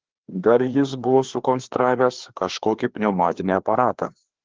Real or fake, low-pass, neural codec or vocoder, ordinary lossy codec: fake; 7.2 kHz; codec, 16 kHz, 2 kbps, FreqCodec, larger model; Opus, 16 kbps